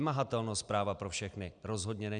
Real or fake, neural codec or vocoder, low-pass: real; none; 9.9 kHz